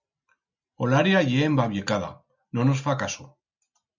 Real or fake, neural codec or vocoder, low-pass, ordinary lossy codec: real; none; 7.2 kHz; MP3, 64 kbps